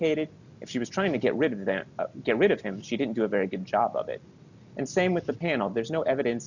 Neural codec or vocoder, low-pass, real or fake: none; 7.2 kHz; real